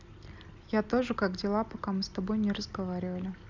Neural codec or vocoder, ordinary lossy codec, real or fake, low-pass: none; none; real; 7.2 kHz